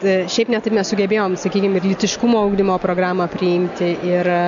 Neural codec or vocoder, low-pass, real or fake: none; 7.2 kHz; real